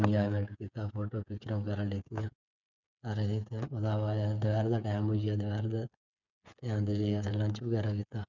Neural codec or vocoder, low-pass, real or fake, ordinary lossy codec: codec, 16 kHz, 8 kbps, FreqCodec, smaller model; 7.2 kHz; fake; none